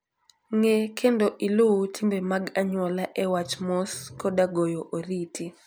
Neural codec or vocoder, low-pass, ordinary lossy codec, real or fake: none; none; none; real